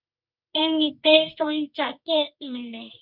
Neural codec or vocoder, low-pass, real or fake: codec, 24 kHz, 0.9 kbps, WavTokenizer, medium music audio release; 5.4 kHz; fake